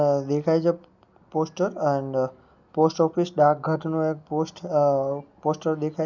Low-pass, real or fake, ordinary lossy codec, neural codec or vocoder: 7.2 kHz; real; none; none